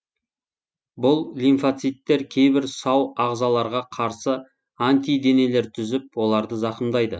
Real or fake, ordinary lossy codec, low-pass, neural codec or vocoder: real; none; none; none